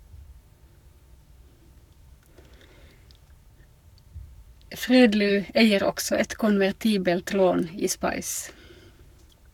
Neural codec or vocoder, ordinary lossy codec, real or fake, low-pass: codec, 44.1 kHz, 7.8 kbps, Pupu-Codec; none; fake; 19.8 kHz